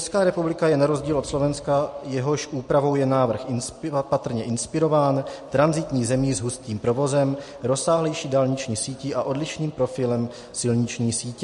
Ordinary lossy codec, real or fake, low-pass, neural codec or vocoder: MP3, 48 kbps; real; 14.4 kHz; none